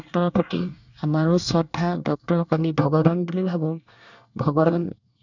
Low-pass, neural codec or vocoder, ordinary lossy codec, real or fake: 7.2 kHz; codec, 24 kHz, 1 kbps, SNAC; none; fake